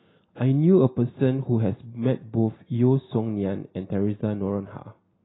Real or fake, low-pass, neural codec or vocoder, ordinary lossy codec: real; 7.2 kHz; none; AAC, 16 kbps